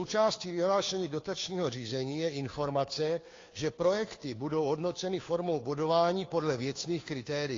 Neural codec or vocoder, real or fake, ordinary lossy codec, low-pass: codec, 16 kHz, 2 kbps, FunCodec, trained on Chinese and English, 25 frames a second; fake; AAC, 32 kbps; 7.2 kHz